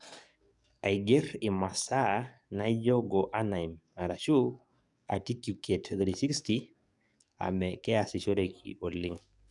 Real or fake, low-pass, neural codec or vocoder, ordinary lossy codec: fake; 10.8 kHz; codec, 44.1 kHz, 7.8 kbps, DAC; none